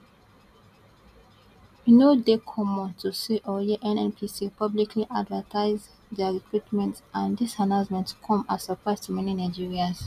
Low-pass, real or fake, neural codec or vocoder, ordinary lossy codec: 14.4 kHz; real; none; none